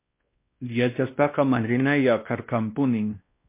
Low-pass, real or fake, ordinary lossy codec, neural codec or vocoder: 3.6 kHz; fake; MP3, 24 kbps; codec, 16 kHz, 1 kbps, X-Codec, WavLM features, trained on Multilingual LibriSpeech